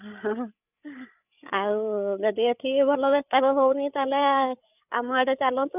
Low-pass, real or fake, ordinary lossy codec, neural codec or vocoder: 3.6 kHz; fake; none; codec, 16 kHz, 8 kbps, FreqCodec, larger model